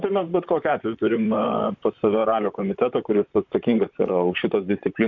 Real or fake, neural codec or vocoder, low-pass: fake; vocoder, 44.1 kHz, 128 mel bands, Pupu-Vocoder; 7.2 kHz